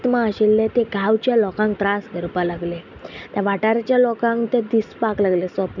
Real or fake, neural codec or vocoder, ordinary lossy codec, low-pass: real; none; none; 7.2 kHz